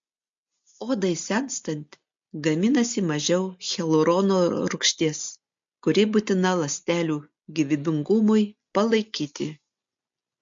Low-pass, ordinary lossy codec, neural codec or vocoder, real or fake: 7.2 kHz; MP3, 48 kbps; none; real